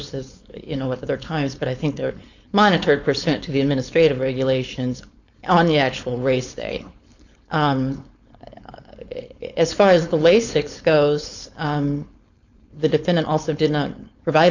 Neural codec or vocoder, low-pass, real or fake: codec, 16 kHz, 4.8 kbps, FACodec; 7.2 kHz; fake